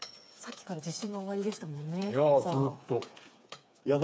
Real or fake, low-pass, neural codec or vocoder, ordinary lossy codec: fake; none; codec, 16 kHz, 4 kbps, FreqCodec, smaller model; none